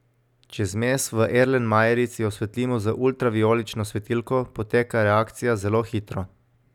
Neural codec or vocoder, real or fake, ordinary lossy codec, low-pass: none; real; none; 19.8 kHz